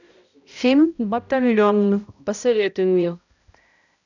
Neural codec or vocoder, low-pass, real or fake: codec, 16 kHz, 0.5 kbps, X-Codec, HuBERT features, trained on balanced general audio; 7.2 kHz; fake